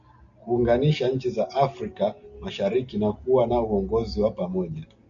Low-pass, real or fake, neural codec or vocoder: 7.2 kHz; real; none